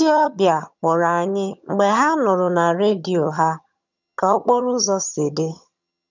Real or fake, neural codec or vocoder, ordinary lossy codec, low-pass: fake; vocoder, 22.05 kHz, 80 mel bands, HiFi-GAN; none; 7.2 kHz